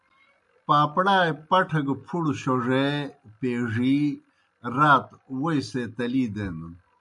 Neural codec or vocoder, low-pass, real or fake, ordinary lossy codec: none; 10.8 kHz; real; MP3, 96 kbps